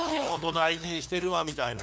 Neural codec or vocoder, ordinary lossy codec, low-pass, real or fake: codec, 16 kHz, 2 kbps, FunCodec, trained on LibriTTS, 25 frames a second; none; none; fake